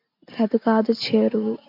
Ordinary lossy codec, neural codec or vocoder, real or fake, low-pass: MP3, 48 kbps; none; real; 5.4 kHz